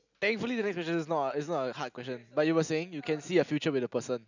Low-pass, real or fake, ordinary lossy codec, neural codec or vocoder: 7.2 kHz; real; none; none